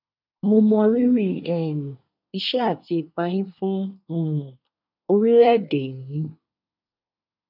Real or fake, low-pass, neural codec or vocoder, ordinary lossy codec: fake; 5.4 kHz; codec, 24 kHz, 1 kbps, SNAC; none